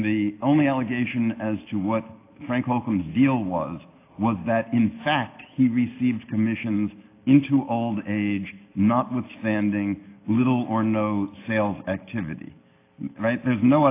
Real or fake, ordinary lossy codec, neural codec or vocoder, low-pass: real; AAC, 24 kbps; none; 3.6 kHz